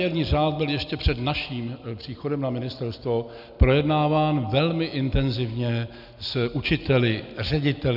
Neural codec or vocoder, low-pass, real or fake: none; 5.4 kHz; real